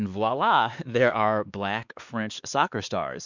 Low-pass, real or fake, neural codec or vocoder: 7.2 kHz; fake; codec, 16 kHz, 2 kbps, X-Codec, WavLM features, trained on Multilingual LibriSpeech